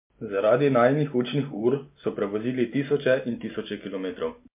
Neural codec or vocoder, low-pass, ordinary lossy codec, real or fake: none; 3.6 kHz; MP3, 32 kbps; real